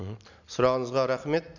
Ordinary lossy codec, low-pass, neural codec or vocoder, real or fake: none; 7.2 kHz; none; real